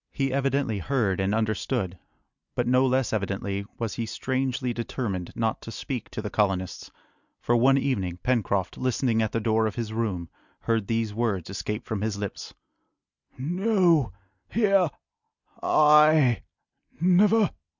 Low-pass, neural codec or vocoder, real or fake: 7.2 kHz; none; real